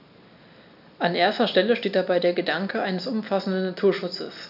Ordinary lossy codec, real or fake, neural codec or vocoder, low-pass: none; real; none; 5.4 kHz